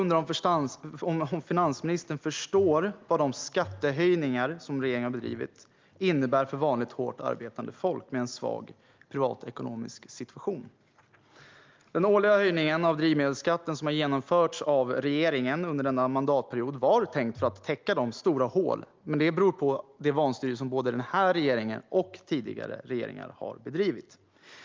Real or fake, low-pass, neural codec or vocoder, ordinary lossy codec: real; 7.2 kHz; none; Opus, 32 kbps